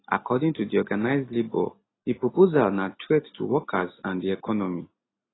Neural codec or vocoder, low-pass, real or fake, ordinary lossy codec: none; 7.2 kHz; real; AAC, 16 kbps